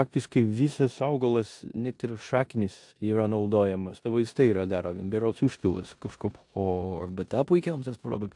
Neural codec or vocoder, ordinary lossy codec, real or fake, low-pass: codec, 16 kHz in and 24 kHz out, 0.9 kbps, LongCat-Audio-Codec, four codebook decoder; AAC, 64 kbps; fake; 10.8 kHz